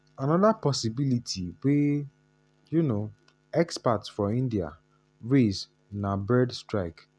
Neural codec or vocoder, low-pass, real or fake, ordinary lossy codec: none; none; real; none